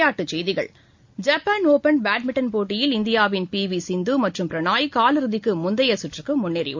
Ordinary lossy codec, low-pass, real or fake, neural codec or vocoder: MP3, 48 kbps; 7.2 kHz; real; none